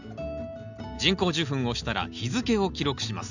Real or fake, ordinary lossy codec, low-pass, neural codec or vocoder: real; none; 7.2 kHz; none